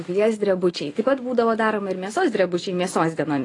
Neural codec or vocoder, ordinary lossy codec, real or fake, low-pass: none; AAC, 32 kbps; real; 10.8 kHz